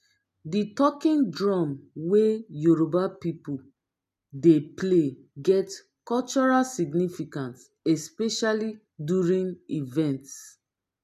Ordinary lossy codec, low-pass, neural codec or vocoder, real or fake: MP3, 96 kbps; 14.4 kHz; none; real